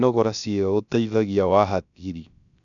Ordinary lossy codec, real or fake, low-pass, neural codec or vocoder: none; fake; 7.2 kHz; codec, 16 kHz, 0.3 kbps, FocalCodec